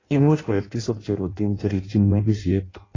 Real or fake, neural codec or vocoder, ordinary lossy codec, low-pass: fake; codec, 16 kHz in and 24 kHz out, 0.6 kbps, FireRedTTS-2 codec; AAC, 32 kbps; 7.2 kHz